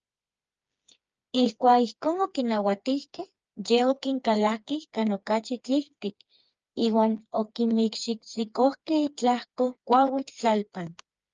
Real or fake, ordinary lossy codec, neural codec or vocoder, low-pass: fake; Opus, 24 kbps; codec, 16 kHz, 4 kbps, FreqCodec, smaller model; 7.2 kHz